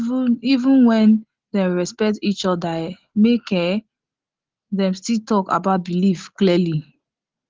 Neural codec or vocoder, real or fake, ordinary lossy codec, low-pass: none; real; Opus, 16 kbps; 7.2 kHz